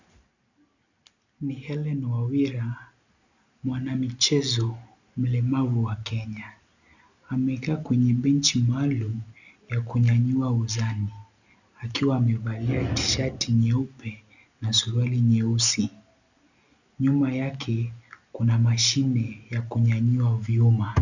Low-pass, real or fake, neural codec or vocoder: 7.2 kHz; real; none